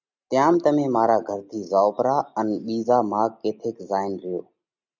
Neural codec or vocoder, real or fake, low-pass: none; real; 7.2 kHz